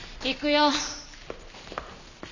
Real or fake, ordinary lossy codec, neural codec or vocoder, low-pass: real; none; none; 7.2 kHz